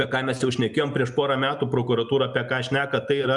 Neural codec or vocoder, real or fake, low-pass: none; real; 10.8 kHz